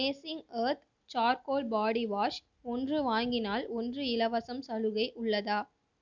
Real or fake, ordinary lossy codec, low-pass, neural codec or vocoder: real; none; 7.2 kHz; none